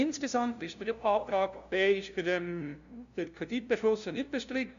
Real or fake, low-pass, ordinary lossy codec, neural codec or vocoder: fake; 7.2 kHz; none; codec, 16 kHz, 0.5 kbps, FunCodec, trained on LibriTTS, 25 frames a second